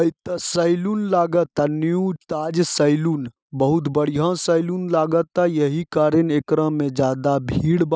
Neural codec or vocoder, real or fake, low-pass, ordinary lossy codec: none; real; none; none